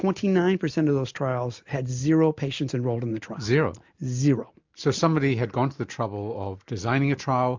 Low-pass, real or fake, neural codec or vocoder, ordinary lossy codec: 7.2 kHz; real; none; MP3, 64 kbps